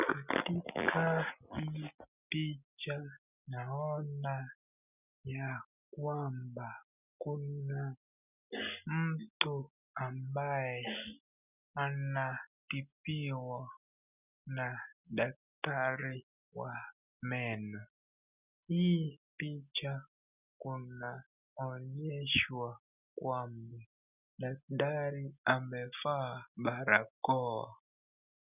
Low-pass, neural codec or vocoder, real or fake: 3.6 kHz; none; real